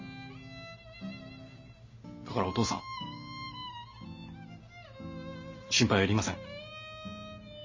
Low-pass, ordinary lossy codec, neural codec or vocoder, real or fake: 7.2 kHz; MP3, 32 kbps; none; real